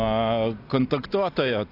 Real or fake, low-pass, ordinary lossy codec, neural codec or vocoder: real; 5.4 kHz; AAC, 32 kbps; none